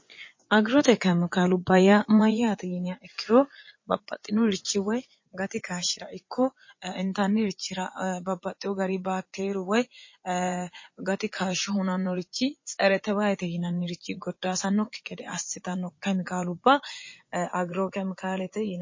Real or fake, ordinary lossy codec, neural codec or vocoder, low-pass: fake; MP3, 32 kbps; vocoder, 24 kHz, 100 mel bands, Vocos; 7.2 kHz